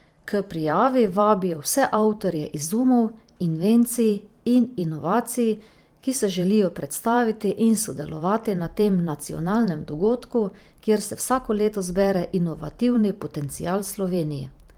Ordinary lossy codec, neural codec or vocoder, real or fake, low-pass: Opus, 32 kbps; vocoder, 44.1 kHz, 128 mel bands every 512 samples, BigVGAN v2; fake; 19.8 kHz